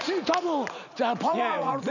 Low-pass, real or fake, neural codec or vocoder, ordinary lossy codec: 7.2 kHz; fake; vocoder, 44.1 kHz, 80 mel bands, Vocos; none